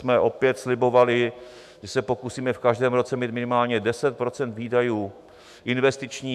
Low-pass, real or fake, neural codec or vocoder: 14.4 kHz; fake; autoencoder, 48 kHz, 128 numbers a frame, DAC-VAE, trained on Japanese speech